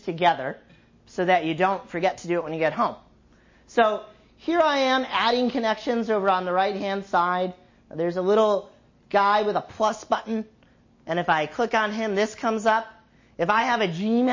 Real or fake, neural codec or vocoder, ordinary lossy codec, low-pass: real; none; MP3, 32 kbps; 7.2 kHz